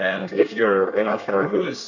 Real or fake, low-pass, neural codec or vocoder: fake; 7.2 kHz; codec, 24 kHz, 1 kbps, SNAC